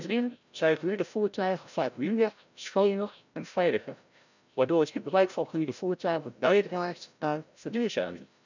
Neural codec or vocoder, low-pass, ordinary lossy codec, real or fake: codec, 16 kHz, 0.5 kbps, FreqCodec, larger model; 7.2 kHz; none; fake